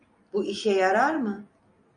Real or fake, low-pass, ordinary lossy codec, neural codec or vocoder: real; 9.9 kHz; AAC, 48 kbps; none